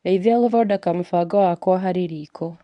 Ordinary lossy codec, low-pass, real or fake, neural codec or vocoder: none; 10.8 kHz; fake; codec, 24 kHz, 0.9 kbps, WavTokenizer, medium speech release version 1